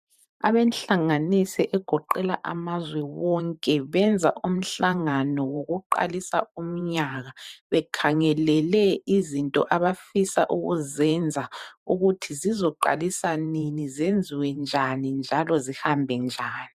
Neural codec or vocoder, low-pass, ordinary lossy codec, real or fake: vocoder, 44.1 kHz, 128 mel bands every 512 samples, BigVGAN v2; 14.4 kHz; MP3, 96 kbps; fake